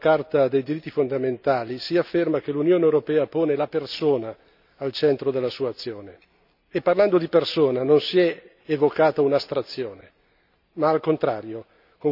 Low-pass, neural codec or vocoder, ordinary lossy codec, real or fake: 5.4 kHz; none; none; real